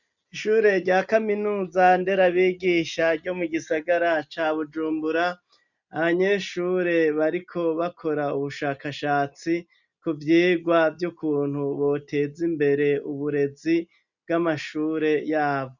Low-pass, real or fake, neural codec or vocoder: 7.2 kHz; real; none